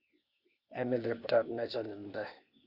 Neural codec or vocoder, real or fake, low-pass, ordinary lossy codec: codec, 16 kHz, 0.8 kbps, ZipCodec; fake; 5.4 kHz; Opus, 64 kbps